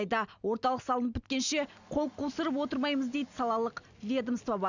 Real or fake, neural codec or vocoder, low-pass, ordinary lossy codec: real; none; 7.2 kHz; none